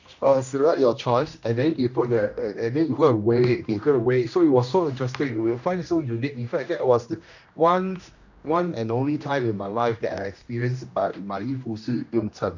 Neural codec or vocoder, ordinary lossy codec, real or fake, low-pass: codec, 16 kHz, 1 kbps, X-Codec, HuBERT features, trained on general audio; none; fake; 7.2 kHz